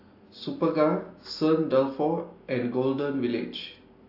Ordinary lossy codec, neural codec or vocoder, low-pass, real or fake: AAC, 32 kbps; none; 5.4 kHz; real